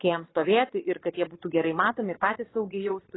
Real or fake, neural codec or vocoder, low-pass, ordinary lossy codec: fake; vocoder, 22.05 kHz, 80 mel bands, Vocos; 7.2 kHz; AAC, 16 kbps